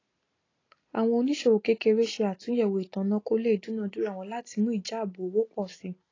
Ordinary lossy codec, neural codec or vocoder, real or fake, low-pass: AAC, 32 kbps; none; real; 7.2 kHz